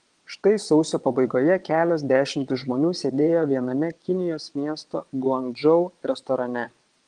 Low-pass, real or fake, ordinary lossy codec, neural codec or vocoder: 9.9 kHz; fake; Opus, 24 kbps; vocoder, 22.05 kHz, 80 mel bands, WaveNeXt